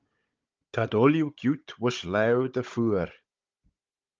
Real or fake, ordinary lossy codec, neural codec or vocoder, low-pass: fake; Opus, 24 kbps; codec, 16 kHz, 16 kbps, FunCodec, trained on Chinese and English, 50 frames a second; 7.2 kHz